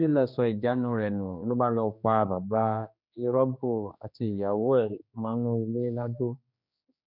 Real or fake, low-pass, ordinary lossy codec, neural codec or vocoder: fake; 5.4 kHz; none; codec, 16 kHz, 2 kbps, X-Codec, HuBERT features, trained on general audio